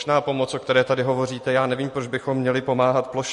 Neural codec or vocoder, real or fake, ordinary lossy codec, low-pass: none; real; MP3, 48 kbps; 14.4 kHz